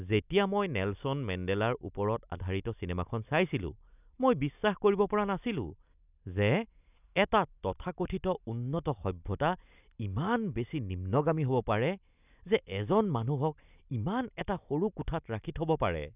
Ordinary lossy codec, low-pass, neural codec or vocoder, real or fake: none; 3.6 kHz; none; real